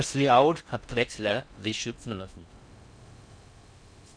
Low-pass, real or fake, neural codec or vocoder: 9.9 kHz; fake; codec, 16 kHz in and 24 kHz out, 0.6 kbps, FocalCodec, streaming, 4096 codes